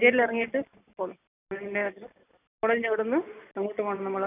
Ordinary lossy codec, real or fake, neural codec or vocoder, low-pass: none; real; none; 3.6 kHz